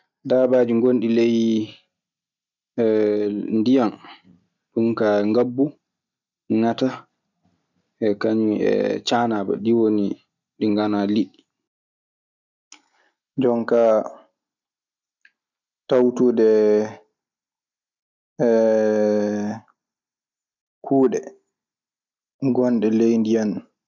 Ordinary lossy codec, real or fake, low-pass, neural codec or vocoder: none; real; 7.2 kHz; none